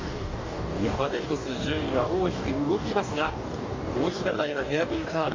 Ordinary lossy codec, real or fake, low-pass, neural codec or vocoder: MP3, 64 kbps; fake; 7.2 kHz; codec, 44.1 kHz, 2.6 kbps, DAC